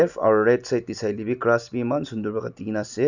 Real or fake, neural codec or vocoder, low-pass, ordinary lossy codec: real; none; 7.2 kHz; none